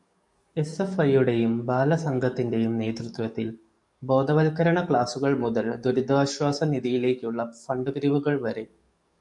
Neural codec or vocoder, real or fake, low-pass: codec, 44.1 kHz, 7.8 kbps, DAC; fake; 10.8 kHz